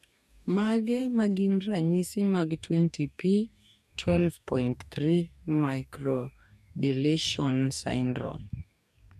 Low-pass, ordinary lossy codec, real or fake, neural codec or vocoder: 14.4 kHz; none; fake; codec, 44.1 kHz, 2.6 kbps, DAC